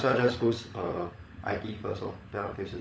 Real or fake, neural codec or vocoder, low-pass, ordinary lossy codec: fake; codec, 16 kHz, 16 kbps, FunCodec, trained on LibriTTS, 50 frames a second; none; none